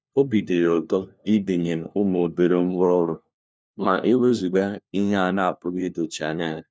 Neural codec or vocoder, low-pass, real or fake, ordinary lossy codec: codec, 16 kHz, 1 kbps, FunCodec, trained on LibriTTS, 50 frames a second; none; fake; none